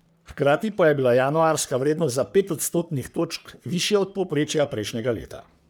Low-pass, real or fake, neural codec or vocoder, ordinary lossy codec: none; fake; codec, 44.1 kHz, 3.4 kbps, Pupu-Codec; none